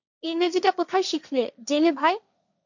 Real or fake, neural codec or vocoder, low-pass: fake; codec, 16 kHz, 1.1 kbps, Voila-Tokenizer; 7.2 kHz